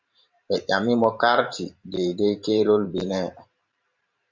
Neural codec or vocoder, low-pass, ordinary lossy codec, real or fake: vocoder, 44.1 kHz, 128 mel bands every 512 samples, BigVGAN v2; 7.2 kHz; Opus, 64 kbps; fake